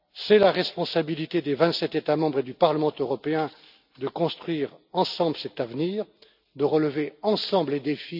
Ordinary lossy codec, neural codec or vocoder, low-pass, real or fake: none; none; 5.4 kHz; real